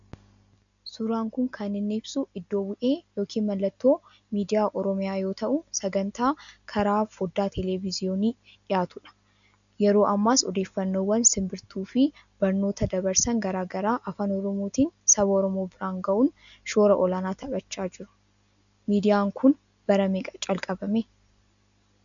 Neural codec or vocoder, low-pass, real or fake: none; 7.2 kHz; real